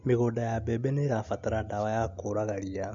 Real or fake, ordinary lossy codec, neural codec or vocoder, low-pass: real; MP3, 48 kbps; none; 7.2 kHz